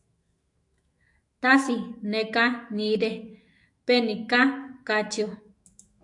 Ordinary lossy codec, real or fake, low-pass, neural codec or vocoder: AAC, 64 kbps; fake; 10.8 kHz; autoencoder, 48 kHz, 128 numbers a frame, DAC-VAE, trained on Japanese speech